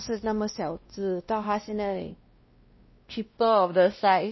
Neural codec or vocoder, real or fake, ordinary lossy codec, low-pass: codec, 16 kHz, 1 kbps, X-Codec, WavLM features, trained on Multilingual LibriSpeech; fake; MP3, 24 kbps; 7.2 kHz